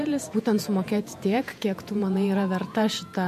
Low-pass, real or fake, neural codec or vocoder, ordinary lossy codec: 14.4 kHz; fake; vocoder, 48 kHz, 128 mel bands, Vocos; MP3, 64 kbps